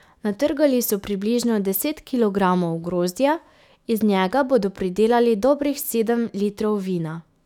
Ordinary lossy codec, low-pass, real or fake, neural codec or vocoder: none; 19.8 kHz; fake; autoencoder, 48 kHz, 128 numbers a frame, DAC-VAE, trained on Japanese speech